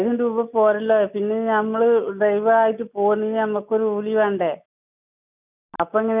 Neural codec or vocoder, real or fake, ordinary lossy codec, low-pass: none; real; none; 3.6 kHz